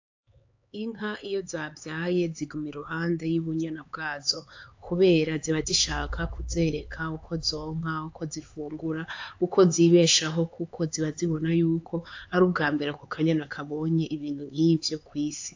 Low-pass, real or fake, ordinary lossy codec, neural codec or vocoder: 7.2 kHz; fake; AAC, 48 kbps; codec, 16 kHz, 4 kbps, X-Codec, HuBERT features, trained on LibriSpeech